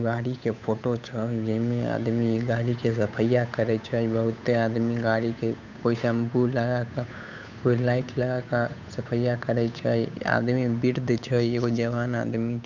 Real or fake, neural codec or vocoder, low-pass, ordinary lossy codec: fake; codec, 16 kHz, 8 kbps, FunCodec, trained on Chinese and English, 25 frames a second; 7.2 kHz; none